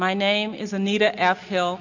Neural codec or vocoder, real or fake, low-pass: none; real; 7.2 kHz